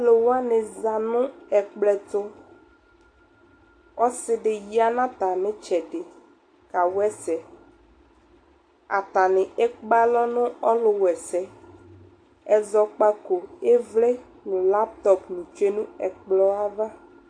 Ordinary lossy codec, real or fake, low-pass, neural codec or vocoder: AAC, 48 kbps; real; 9.9 kHz; none